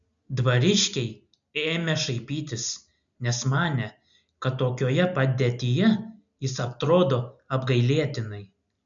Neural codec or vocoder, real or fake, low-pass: none; real; 7.2 kHz